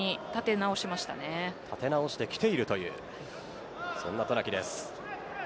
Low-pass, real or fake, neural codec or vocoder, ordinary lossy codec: none; real; none; none